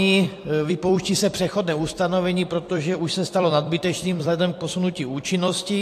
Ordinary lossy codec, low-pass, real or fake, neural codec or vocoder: AAC, 64 kbps; 14.4 kHz; fake; vocoder, 44.1 kHz, 128 mel bands every 256 samples, BigVGAN v2